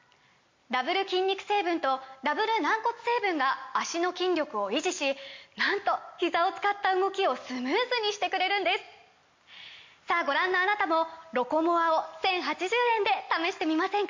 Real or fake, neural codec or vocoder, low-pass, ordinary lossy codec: real; none; 7.2 kHz; MP3, 48 kbps